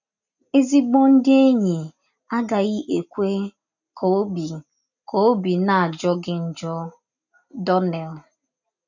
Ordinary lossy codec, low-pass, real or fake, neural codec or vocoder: none; 7.2 kHz; real; none